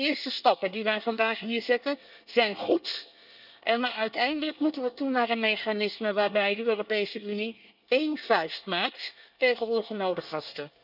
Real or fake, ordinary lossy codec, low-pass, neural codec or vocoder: fake; none; 5.4 kHz; codec, 24 kHz, 1 kbps, SNAC